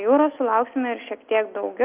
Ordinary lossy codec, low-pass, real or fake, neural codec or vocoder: Opus, 24 kbps; 3.6 kHz; real; none